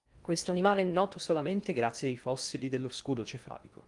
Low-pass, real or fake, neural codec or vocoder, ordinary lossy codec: 10.8 kHz; fake; codec, 16 kHz in and 24 kHz out, 0.6 kbps, FocalCodec, streaming, 2048 codes; Opus, 32 kbps